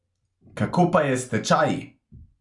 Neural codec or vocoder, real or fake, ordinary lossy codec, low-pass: none; real; none; 10.8 kHz